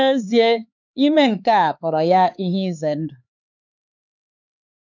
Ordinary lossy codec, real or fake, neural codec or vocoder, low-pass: none; fake; codec, 16 kHz, 4 kbps, X-Codec, HuBERT features, trained on LibriSpeech; 7.2 kHz